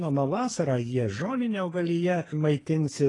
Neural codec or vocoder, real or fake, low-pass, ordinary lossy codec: codec, 44.1 kHz, 2.6 kbps, SNAC; fake; 10.8 kHz; AAC, 32 kbps